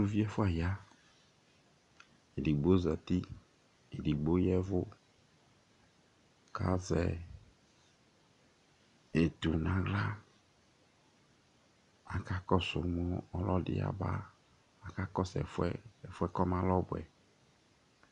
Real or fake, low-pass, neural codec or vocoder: real; 10.8 kHz; none